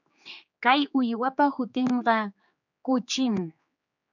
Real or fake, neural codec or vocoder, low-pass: fake; codec, 16 kHz, 4 kbps, X-Codec, HuBERT features, trained on general audio; 7.2 kHz